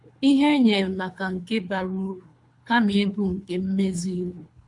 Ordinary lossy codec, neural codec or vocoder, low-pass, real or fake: AAC, 64 kbps; codec, 24 kHz, 3 kbps, HILCodec; 10.8 kHz; fake